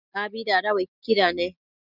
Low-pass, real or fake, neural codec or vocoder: 5.4 kHz; real; none